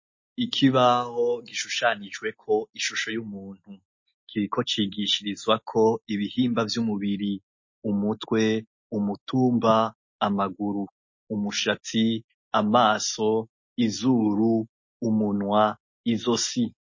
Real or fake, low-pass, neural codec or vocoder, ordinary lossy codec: real; 7.2 kHz; none; MP3, 32 kbps